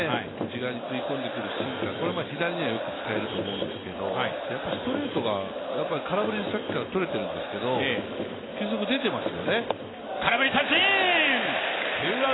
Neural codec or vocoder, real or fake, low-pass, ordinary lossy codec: none; real; 7.2 kHz; AAC, 16 kbps